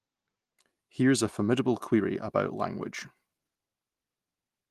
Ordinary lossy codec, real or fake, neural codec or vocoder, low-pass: Opus, 24 kbps; real; none; 14.4 kHz